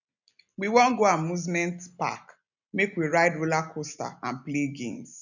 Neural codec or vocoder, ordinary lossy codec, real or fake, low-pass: none; none; real; 7.2 kHz